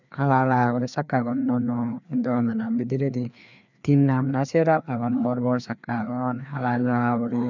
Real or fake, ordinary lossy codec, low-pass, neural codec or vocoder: fake; none; 7.2 kHz; codec, 16 kHz, 2 kbps, FreqCodec, larger model